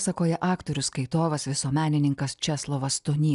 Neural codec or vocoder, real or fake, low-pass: none; real; 10.8 kHz